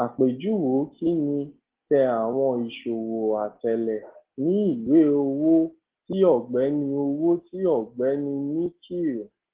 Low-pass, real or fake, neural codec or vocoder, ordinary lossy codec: 3.6 kHz; real; none; Opus, 16 kbps